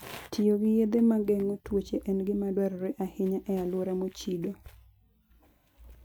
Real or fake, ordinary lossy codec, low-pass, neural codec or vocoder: real; none; none; none